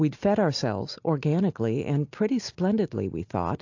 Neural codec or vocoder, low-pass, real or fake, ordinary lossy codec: none; 7.2 kHz; real; AAC, 48 kbps